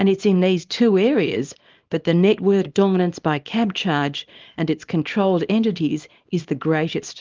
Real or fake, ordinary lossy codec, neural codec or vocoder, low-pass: fake; Opus, 32 kbps; codec, 24 kHz, 0.9 kbps, WavTokenizer, small release; 7.2 kHz